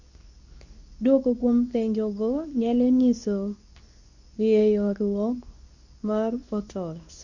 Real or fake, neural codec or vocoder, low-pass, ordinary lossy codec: fake; codec, 24 kHz, 0.9 kbps, WavTokenizer, medium speech release version 2; 7.2 kHz; none